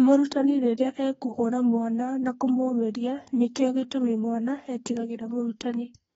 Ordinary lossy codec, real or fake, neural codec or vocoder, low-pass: AAC, 24 kbps; fake; codec, 32 kHz, 1.9 kbps, SNAC; 14.4 kHz